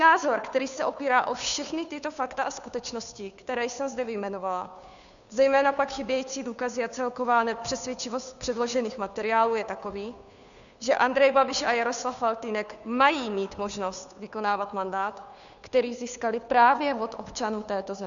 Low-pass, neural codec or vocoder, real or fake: 7.2 kHz; codec, 16 kHz, 2 kbps, FunCodec, trained on Chinese and English, 25 frames a second; fake